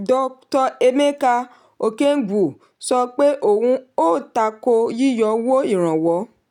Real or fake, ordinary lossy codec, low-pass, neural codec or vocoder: real; none; 19.8 kHz; none